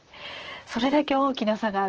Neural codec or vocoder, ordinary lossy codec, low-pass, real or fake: vocoder, 44.1 kHz, 128 mel bands, Pupu-Vocoder; Opus, 16 kbps; 7.2 kHz; fake